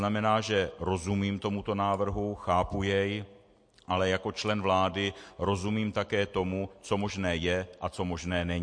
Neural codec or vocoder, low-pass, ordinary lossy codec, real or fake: none; 9.9 kHz; MP3, 48 kbps; real